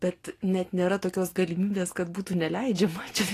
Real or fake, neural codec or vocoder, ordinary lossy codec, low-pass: real; none; AAC, 48 kbps; 14.4 kHz